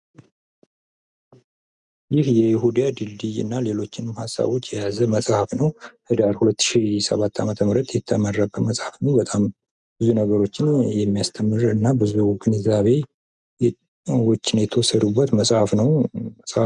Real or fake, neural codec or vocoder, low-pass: real; none; 10.8 kHz